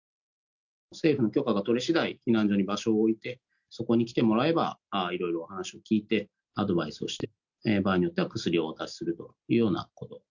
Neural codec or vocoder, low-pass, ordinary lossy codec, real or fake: none; 7.2 kHz; none; real